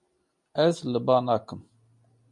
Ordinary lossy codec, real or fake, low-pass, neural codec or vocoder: MP3, 48 kbps; real; 10.8 kHz; none